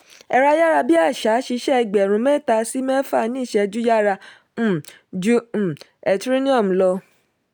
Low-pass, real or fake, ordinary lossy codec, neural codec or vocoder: none; real; none; none